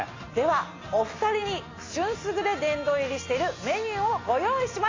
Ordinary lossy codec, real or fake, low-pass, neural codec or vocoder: AAC, 32 kbps; real; 7.2 kHz; none